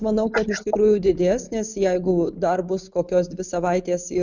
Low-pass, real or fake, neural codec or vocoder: 7.2 kHz; real; none